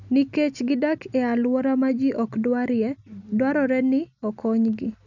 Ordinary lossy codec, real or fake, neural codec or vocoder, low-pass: none; real; none; 7.2 kHz